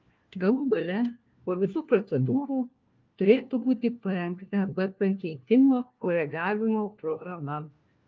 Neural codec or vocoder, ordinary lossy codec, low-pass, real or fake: codec, 16 kHz, 1 kbps, FunCodec, trained on LibriTTS, 50 frames a second; Opus, 24 kbps; 7.2 kHz; fake